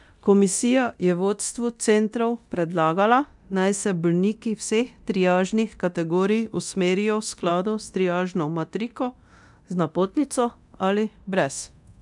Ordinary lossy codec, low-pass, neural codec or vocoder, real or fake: none; 10.8 kHz; codec, 24 kHz, 0.9 kbps, DualCodec; fake